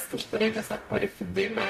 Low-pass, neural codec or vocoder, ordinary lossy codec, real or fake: 14.4 kHz; codec, 44.1 kHz, 0.9 kbps, DAC; MP3, 64 kbps; fake